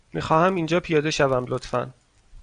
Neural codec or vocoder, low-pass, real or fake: none; 9.9 kHz; real